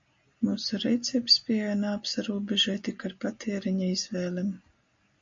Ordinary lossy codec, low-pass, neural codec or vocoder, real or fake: MP3, 48 kbps; 7.2 kHz; none; real